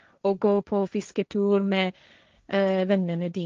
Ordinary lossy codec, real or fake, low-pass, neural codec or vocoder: Opus, 32 kbps; fake; 7.2 kHz; codec, 16 kHz, 1.1 kbps, Voila-Tokenizer